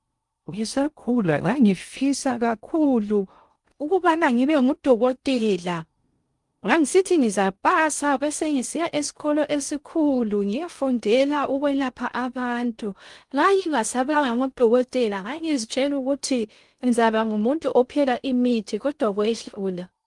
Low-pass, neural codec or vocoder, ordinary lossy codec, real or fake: 10.8 kHz; codec, 16 kHz in and 24 kHz out, 0.6 kbps, FocalCodec, streaming, 2048 codes; Opus, 32 kbps; fake